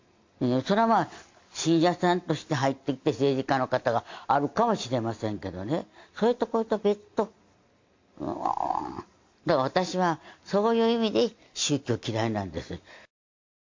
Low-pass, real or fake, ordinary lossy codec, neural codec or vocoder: 7.2 kHz; real; MP3, 48 kbps; none